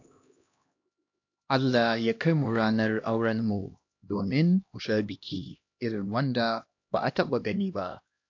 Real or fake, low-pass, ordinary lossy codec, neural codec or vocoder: fake; 7.2 kHz; AAC, 48 kbps; codec, 16 kHz, 1 kbps, X-Codec, HuBERT features, trained on LibriSpeech